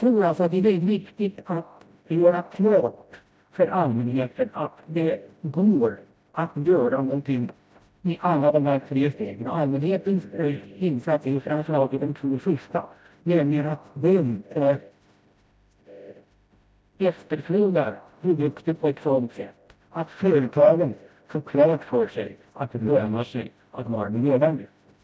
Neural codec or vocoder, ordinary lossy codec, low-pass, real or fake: codec, 16 kHz, 0.5 kbps, FreqCodec, smaller model; none; none; fake